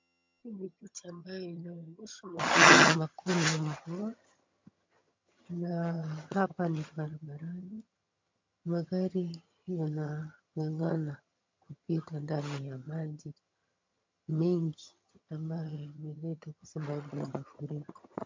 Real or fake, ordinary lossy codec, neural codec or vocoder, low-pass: fake; MP3, 48 kbps; vocoder, 22.05 kHz, 80 mel bands, HiFi-GAN; 7.2 kHz